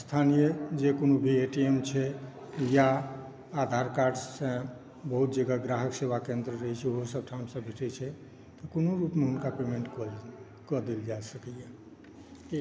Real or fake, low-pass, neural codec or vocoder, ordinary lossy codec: real; none; none; none